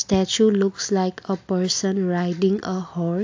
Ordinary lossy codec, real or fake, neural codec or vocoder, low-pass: AAC, 48 kbps; real; none; 7.2 kHz